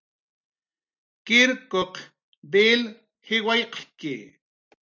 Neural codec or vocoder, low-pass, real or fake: none; 7.2 kHz; real